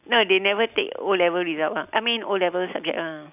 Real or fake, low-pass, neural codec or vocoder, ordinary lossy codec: real; 3.6 kHz; none; none